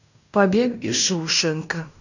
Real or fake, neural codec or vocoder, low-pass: fake; codec, 16 kHz in and 24 kHz out, 0.9 kbps, LongCat-Audio-Codec, fine tuned four codebook decoder; 7.2 kHz